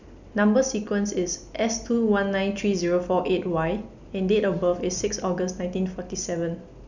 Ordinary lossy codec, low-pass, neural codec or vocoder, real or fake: none; 7.2 kHz; none; real